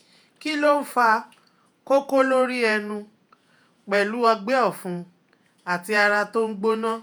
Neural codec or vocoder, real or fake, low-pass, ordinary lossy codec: vocoder, 48 kHz, 128 mel bands, Vocos; fake; none; none